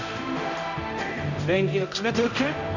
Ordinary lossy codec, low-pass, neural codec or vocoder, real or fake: none; 7.2 kHz; codec, 16 kHz, 0.5 kbps, X-Codec, HuBERT features, trained on general audio; fake